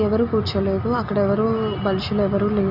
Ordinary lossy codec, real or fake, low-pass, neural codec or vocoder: none; real; 5.4 kHz; none